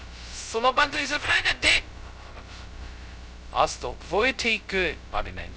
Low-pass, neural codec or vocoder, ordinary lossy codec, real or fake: none; codec, 16 kHz, 0.2 kbps, FocalCodec; none; fake